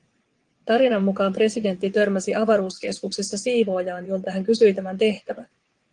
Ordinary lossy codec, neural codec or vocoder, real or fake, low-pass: Opus, 24 kbps; vocoder, 22.05 kHz, 80 mel bands, WaveNeXt; fake; 9.9 kHz